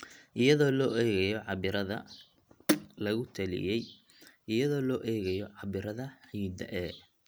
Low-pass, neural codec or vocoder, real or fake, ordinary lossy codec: none; none; real; none